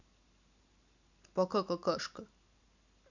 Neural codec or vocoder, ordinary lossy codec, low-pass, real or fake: none; none; 7.2 kHz; real